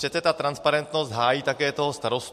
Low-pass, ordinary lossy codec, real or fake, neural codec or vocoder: 14.4 kHz; MP3, 64 kbps; real; none